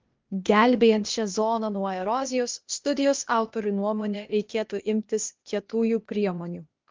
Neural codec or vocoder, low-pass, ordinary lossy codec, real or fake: codec, 16 kHz, 0.8 kbps, ZipCodec; 7.2 kHz; Opus, 32 kbps; fake